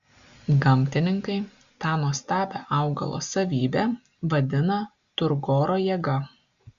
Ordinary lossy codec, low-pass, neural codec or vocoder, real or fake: Opus, 64 kbps; 7.2 kHz; none; real